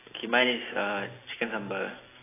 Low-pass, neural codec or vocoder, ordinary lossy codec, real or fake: 3.6 kHz; none; AAC, 16 kbps; real